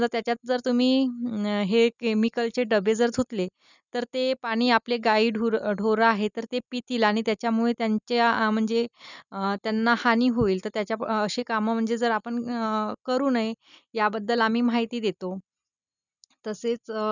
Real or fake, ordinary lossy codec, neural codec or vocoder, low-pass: real; none; none; 7.2 kHz